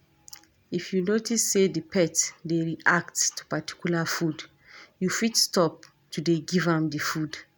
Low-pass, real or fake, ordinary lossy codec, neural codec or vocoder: none; real; none; none